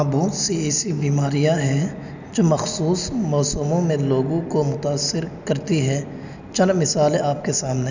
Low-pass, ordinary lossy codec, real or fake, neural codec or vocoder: 7.2 kHz; none; real; none